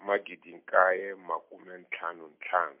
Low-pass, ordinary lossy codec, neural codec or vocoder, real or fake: 3.6 kHz; MP3, 32 kbps; none; real